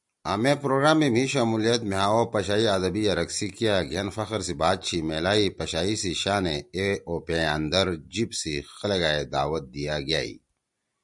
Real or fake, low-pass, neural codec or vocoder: real; 10.8 kHz; none